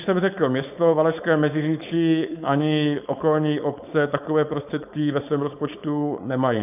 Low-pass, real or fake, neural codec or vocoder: 3.6 kHz; fake; codec, 16 kHz, 4.8 kbps, FACodec